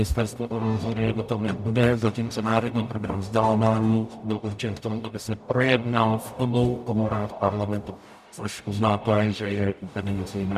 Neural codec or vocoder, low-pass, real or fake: codec, 44.1 kHz, 0.9 kbps, DAC; 14.4 kHz; fake